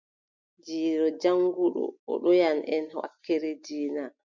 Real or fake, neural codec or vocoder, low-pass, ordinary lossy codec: real; none; 7.2 kHz; MP3, 64 kbps